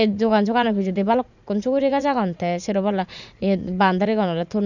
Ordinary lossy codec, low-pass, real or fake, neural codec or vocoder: none; 7.2 kHz; fake; vocoder, 44.1 kHz, 80 mel bands, Vocos